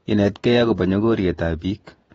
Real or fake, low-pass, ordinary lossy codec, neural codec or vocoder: fake; 19.8 kHz; AAC, 24 kbps; vocoder, 48 kHz, 128 mel bands, Vocos